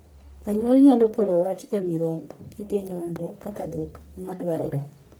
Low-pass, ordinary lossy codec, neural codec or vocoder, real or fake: none; none; codec, 44.1 kHz, 1.7 kbps, Pupu-Codec; fake